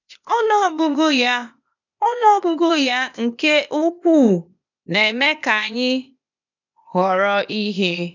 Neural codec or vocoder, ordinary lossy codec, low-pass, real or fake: codec, 16 kHz, 0.8 kbps, ZipCodec; none; 7.2 kHz; fake